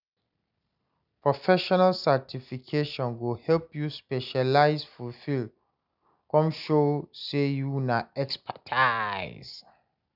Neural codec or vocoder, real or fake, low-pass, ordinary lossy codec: none; real; 5.4 kHz; none